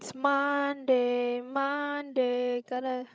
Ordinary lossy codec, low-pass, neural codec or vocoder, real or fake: none; none; codec, 16 kHz, 16 kbps, FreqCodec, larger model; fake